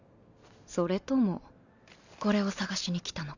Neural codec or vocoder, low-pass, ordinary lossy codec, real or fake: none; 7.2 kHz; none; real